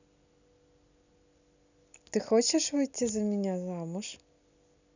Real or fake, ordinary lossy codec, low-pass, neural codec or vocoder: real; none; 7.2 kHz; none